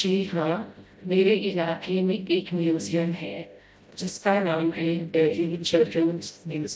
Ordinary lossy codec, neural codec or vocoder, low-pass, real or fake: none; codec, 16 kHz, 0.5 kbps, FreqCodec, smaller model; none; fake